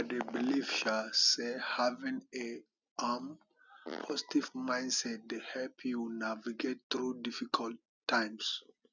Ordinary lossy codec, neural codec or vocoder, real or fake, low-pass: none; none; real; 7.2 kHz